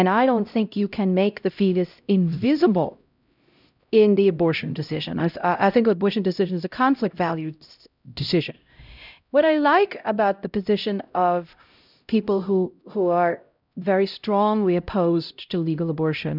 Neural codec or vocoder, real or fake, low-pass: codec, 16 kHz, 0.5 kbps, X-Codec, HuBERT features, trained on LibriSpeech; fake; 5.4 kHz